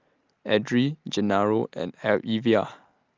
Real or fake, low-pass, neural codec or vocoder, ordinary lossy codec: real; 7.2 kHz; none; Opus, 24 kbps